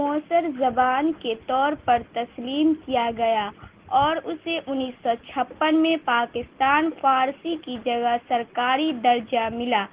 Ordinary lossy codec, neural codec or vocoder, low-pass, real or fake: Opus, 16 kbps; none; 3.6 kHz; real